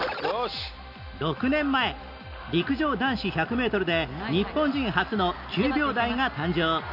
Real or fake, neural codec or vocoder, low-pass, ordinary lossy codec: real; none; 5.4 kHz; none